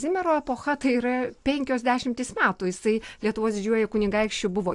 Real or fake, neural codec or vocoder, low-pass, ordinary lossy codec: real; none; 10.8 kHz; AAC, 64 kbps